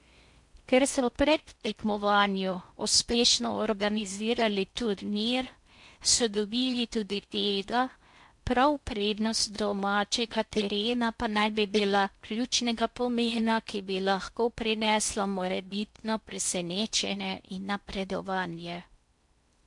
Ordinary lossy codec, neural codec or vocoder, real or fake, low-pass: MP3, 64 kbps; codec, 16 kHz in and 24 kHz out, 0.6 kbps, FocalCodec, streaming, 4096 codes; fake; 10.8 kHz